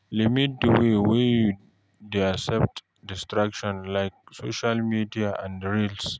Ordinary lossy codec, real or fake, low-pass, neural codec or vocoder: none; real; none; none